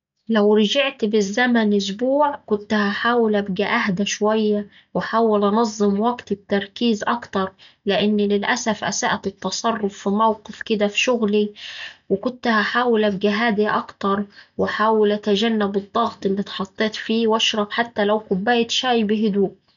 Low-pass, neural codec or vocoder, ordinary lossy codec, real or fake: 7.2 kHz; none; none; real